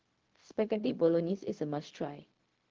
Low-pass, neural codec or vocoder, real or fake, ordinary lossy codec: 7.2 kHz; codec, 16 kHz, 0.4 kbps, LongCat-Audio-Codec; fake; Opus, 16 kbps